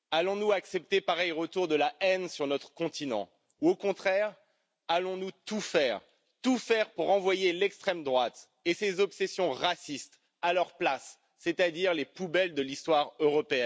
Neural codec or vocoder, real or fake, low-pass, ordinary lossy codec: none; real; none; none